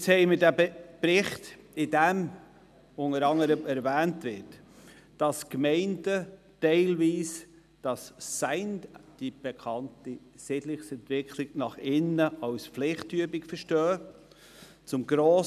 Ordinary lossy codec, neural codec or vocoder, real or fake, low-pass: none; none; real; 14.4 kHz